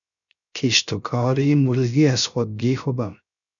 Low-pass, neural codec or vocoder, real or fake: 7.2 kHz; codec, 16 kHz, 0.3 kbps, FocalCodec; fake